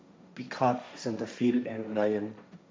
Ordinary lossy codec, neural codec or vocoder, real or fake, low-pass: none; codec, 16 kHz, 1.1 kbps, Voila-Tokenizer; fake; none